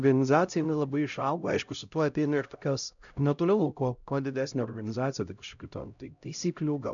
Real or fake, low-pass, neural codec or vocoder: fake; 7.2 kHz; codec, 16 kHz, 0.5 kbps, X-Codec, HuBERT features, trained on LibriSpeech